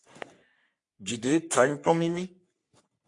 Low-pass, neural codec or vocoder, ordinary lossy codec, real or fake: 10.8 kHz; codec, 44.1 kHz, 3.4 kbps, Pupu-Codec; AAC, 64 kbps; fake